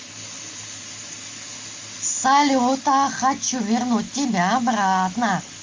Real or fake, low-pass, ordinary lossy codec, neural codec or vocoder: real; 7.2 kHz; Opus, 32 kbps; none